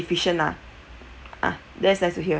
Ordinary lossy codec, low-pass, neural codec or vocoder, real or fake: none; none; none; real